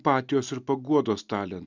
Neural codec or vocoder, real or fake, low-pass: none; real; 7.2 kHz